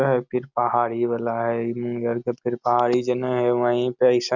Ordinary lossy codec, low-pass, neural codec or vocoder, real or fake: none; 7.2 kHz; none; real